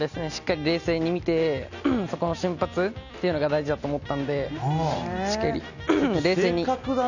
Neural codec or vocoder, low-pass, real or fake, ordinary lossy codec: none; 7.2 kHz; real; none